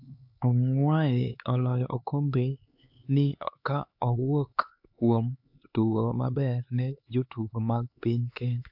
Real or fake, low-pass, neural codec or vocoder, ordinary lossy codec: fake; 5.4 kHz; codec, 16 kHz, 4 kbps, X-Codec, HuBERT features, trained on LibriSpeech; AAC, 48 kbps